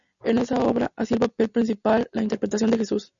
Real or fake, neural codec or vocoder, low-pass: real; none; 7.2 kHz